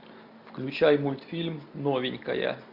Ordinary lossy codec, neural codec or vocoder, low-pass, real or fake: MP3, 48 kbps; none; 5.4 kHz; real